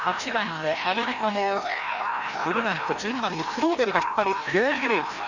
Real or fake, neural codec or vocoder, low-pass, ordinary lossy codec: fake; codec, 16 kHz, 1 kbps, FreqCodec, larger model; 7.2 kHz; none